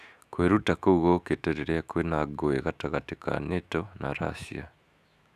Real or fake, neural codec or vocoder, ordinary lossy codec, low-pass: fake; autoencoder, 48 kHz, 128 numbers a frame, DAC-VAE, trained on Japanese speech; none; 14.4 kHz